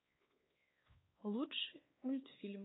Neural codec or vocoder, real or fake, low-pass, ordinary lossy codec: codec, 16 kHz, 2 kbps, X-Codec, WavLM features, trained on Multilingual LibriSpeech; fake; 7.2 kHz; AAC, 16 kbps